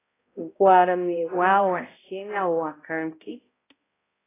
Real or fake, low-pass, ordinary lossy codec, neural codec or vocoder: fake; 3.6 kHz; AAC, 16 kbps; codec, 16 kHz, 0.5 kbps, X-Codec, HuBERT features, trained on balanced general audio